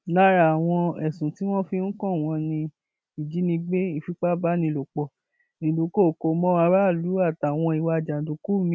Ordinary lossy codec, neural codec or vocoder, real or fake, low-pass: none; none; real; none